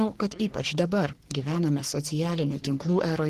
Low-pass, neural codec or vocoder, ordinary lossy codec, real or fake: 14.4 kHz; codec, 44.1 kHz, 3.4 kbps, Pupu-Codec; Opus, 16 kbps; fake